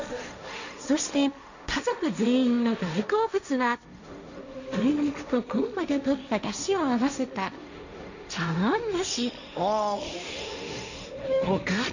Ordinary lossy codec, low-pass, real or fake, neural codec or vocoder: none; 7.2 kHz; fake; codec, 16 kHz, 1.1 kbps, Voila-Tokenizer